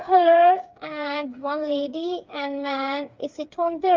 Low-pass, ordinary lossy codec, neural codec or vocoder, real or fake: 7.2 kHz; Opus, 32 kbps; codec, 16 kHz, 4 kbps, FreqCodec, smaller model; fake